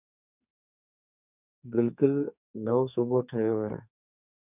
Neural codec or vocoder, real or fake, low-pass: codec, 44.1 kHz, 2.6 kbps, SNAC; fake; 3.6 kHz